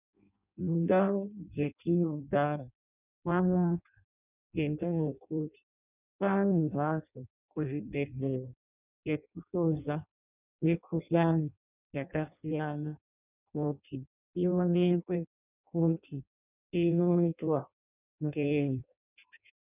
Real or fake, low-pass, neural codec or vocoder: fake; 3.6 kHz; codec, 16 kHz in and 24 kHz out, 0.6 kbps, FireRedTTS-2 codec